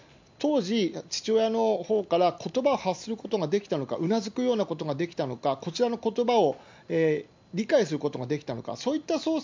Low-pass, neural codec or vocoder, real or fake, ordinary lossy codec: 7.2 kHz; none; real; MP3, 64 kbps